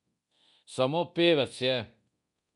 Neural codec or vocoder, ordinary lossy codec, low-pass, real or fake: codec, 24 kHz, 0.9 kbps, DualCodec; MP3, 96 kbps; 10.8 kHz; fake